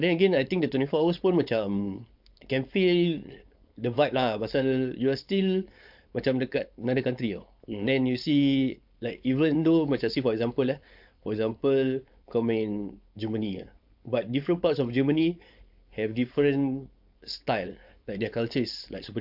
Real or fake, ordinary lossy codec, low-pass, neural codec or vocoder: fake; AAC, 48 kbps; 5.4 kHz; codec, 16 kHz, 4.8 kbps, FACodec